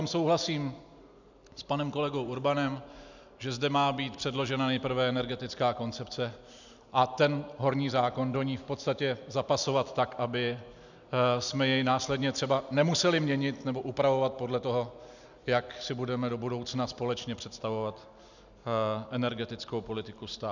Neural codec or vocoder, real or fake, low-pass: none; real; 7.2 kHz